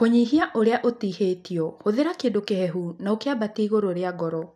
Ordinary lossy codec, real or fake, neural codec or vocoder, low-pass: none; real; none; 14.4 kHz